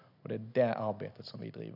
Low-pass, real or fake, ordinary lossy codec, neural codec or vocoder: 5.4 kHz; real; none; none